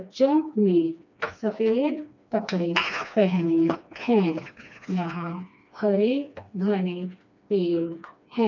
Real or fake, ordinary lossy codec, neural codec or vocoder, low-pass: fake; none; codec, 16 kHz, 2 kbps, FreqCodec, smaller model; 7.2 kHz